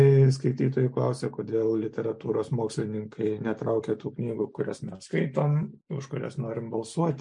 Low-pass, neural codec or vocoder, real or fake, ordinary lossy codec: 9.9 kHz; none; real; MP3, 64 kbps